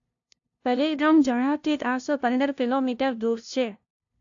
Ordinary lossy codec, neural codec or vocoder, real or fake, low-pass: AAC, 48 kbps; codec, 16 kHz, 0.5 kbps, FunCodec, trained on LibriTTS, 25 frames a second; fake; 7.2 kHz